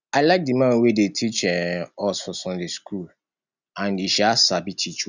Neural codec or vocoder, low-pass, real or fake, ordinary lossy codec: none; 7.2 kHz; real; none